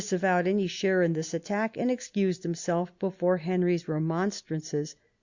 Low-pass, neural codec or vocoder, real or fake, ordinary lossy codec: 7.2 kHz; none; real; Opus, 64 kbps